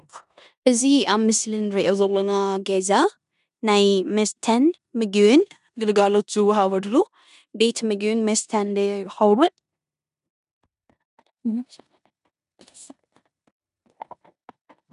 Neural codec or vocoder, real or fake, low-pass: codec, 16 kHz in and 24 kHz out, 0.9 kbps, LongCat-Audio-Codec, four codebook decoder; fake; 10.8 kHz